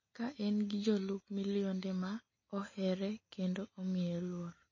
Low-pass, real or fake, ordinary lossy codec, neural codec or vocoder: 7.2 kHz; real; MP3, 32 kbps; none